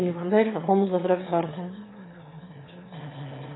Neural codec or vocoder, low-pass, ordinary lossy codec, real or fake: autoencoder, 22.05 kHz, a latent of 192 numbers a frame, VITS, trained on one speaker; 7.2 kHz; AAC, 16 kbps; fake